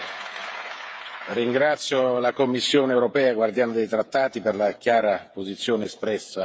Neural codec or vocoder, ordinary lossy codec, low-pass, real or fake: codec, 16 kHz, 8 kbps, FreqCodec, smaller model; none; none; fake